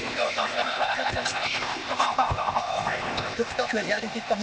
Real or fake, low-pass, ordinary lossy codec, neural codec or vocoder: fake; none; none; codec, 16 kHz, 0.8 kbps, ZipCodec